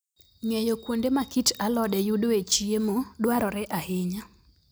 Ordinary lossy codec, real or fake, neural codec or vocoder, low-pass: none; real; none; none